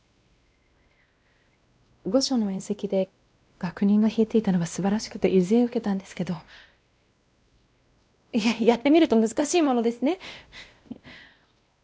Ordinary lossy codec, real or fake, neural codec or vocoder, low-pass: none; fake; codec, 16 kHz, 1 kbps, X-Codec, WavLM features, trained on Multilingual LibriSpeech; none